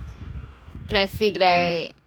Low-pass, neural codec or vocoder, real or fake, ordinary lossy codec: none; codec, 44.1 kHz, 2.6 kbps, DAC; fake; none